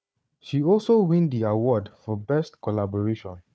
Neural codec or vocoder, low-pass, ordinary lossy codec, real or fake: codec, 16 kHz, 4 kbps, FunCodec, trained on Chinese and English, 50 frames a second; none; none; fake